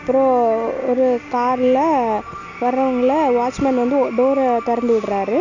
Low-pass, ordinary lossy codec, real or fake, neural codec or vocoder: 7.2 kHz; none; real; none